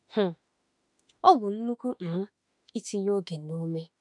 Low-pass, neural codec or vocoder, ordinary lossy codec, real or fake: 10.8 kHz; autoencoder, 48 kHz, 32 numbers a frame, DAC-VAE, trained on Japanese speech; none; fake